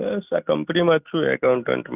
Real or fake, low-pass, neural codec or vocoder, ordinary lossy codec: real; 3.6 kHz; none; none